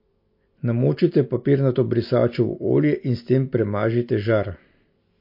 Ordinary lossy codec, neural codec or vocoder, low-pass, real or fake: MP3, 32 kbps; none; 5.4 kHz; real